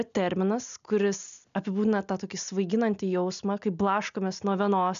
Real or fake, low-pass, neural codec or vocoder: real; 7.2 kHz; none